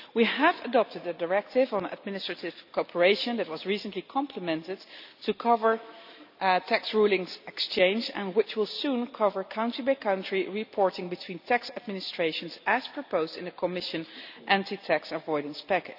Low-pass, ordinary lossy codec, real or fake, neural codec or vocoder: 5.4 kHz; none; real; none